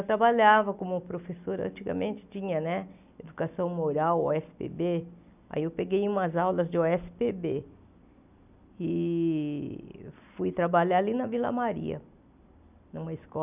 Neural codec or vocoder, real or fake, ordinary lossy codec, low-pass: none; real; none; 3.6 kHz